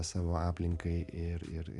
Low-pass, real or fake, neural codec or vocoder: 10.8 kHz; real; none